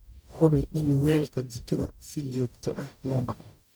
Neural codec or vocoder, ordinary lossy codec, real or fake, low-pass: codec, 44.1 kHz, 0.9 kbps, DAC; none; fake; none